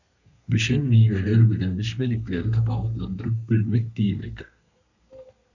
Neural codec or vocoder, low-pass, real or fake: codec, 32 kHz, 1.9 kbps, SNAC; 7.2 kHz; fake